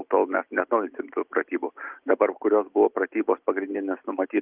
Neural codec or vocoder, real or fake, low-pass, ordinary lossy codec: none; real; 3.6 kHz; Opus, 32 kbps